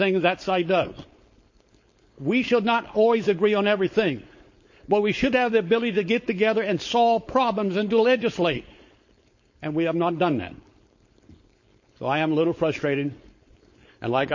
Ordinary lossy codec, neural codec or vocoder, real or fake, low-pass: MP3, 32 kbps; codec, 16 kHz, 4.8 kbps, FACodec; fake; 7.2 kHz